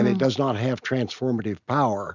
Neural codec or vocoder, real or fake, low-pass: none; real; 7.2 kHz